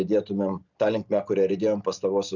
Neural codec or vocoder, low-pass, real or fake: none; 7.2 kHz; real